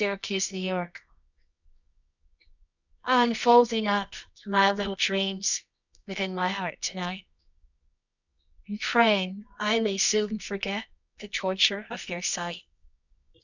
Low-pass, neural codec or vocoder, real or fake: 7.2 kHz; codec, 24 kHz, 0.9 kbps, WavTokenizer, medium music audio release; fake